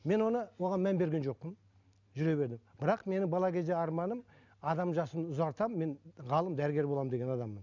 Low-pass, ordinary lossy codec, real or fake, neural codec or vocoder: 7.2 kHz; none; real; none